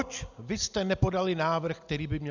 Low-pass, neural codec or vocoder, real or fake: 7.2 kHz; none; real